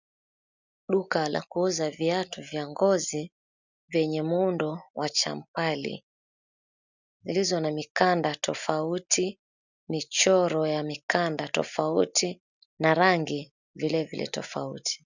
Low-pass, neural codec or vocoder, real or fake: 7.2 kHz; none; real